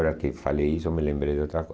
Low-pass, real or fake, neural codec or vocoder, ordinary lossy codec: none; real; none; none